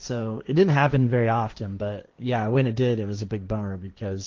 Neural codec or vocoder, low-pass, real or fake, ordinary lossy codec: codec, 16 kHz, 1.1 kbps, Voila-Tokenizer; 7.2 kHz; fake; Opus, 32 kbps